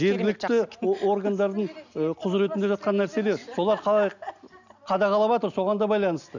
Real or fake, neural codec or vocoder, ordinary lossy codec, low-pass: real; none; none; 7.2 kHz